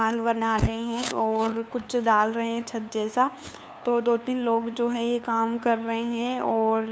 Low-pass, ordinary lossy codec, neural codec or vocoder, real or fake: none; none; codec, 16 kHz, 2 kbps, FunCodec, trained on LibriTTS, 25 frames a second; fake